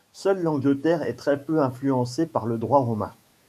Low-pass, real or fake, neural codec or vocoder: 14.4 kHz; fake; autoencoder, 48 kHz, 128 numbers a frame, DAC-VAE, trained on Japanese speech